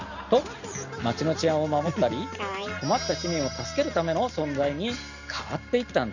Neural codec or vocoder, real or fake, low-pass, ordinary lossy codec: none; real; 7.2 kHz; none